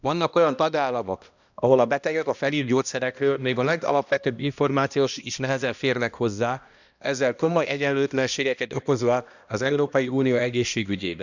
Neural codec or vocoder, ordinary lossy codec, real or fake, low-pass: codec, 16 kHz, 1 kbps, X-Codec, HuBERT features, trained on balanced general audio; none; fake; 7.2 kHz